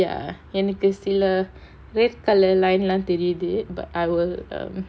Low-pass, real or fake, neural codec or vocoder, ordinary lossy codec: none; real; none; none